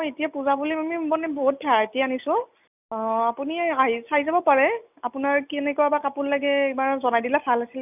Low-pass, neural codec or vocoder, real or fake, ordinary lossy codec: 3.6 kHz; none; real; none